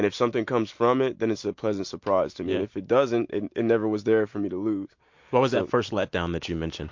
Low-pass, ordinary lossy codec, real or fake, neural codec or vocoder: 7.2 kHz; MP3, 48 kbps; real; none